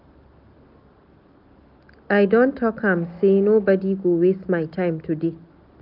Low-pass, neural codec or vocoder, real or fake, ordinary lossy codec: 5.4 kHz; none; real; none